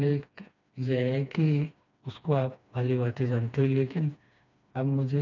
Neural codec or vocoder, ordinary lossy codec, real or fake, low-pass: codec, 16 kHz, 2 kbps, FreqCodec, smaller model; none; fake; 7.2 kHz